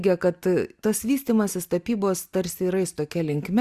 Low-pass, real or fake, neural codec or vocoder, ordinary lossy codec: 14.4 kHz; real; none; Opus, 64 kbps